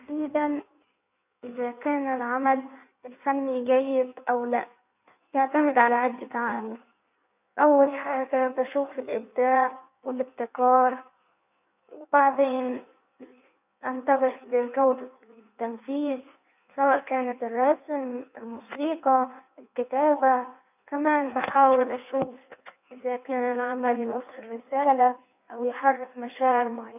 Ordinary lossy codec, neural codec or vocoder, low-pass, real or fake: none; codec, 16 kHz in and 24 kHz out, 1.1 kbps, FireRedTTS-2 codec; 3.6 kHz; fake